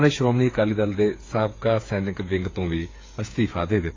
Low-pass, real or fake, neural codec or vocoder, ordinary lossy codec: 7.2 kHz; fake; codec, 16 kHz, 8 kbps, FreqCodec, smaller model; AAC, 32 kbps